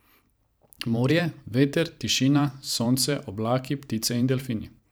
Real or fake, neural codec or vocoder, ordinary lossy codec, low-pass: fake; vocoder, 44.1 kHz, 128 mel bands every 512 samples, BigVGAN v2; none; none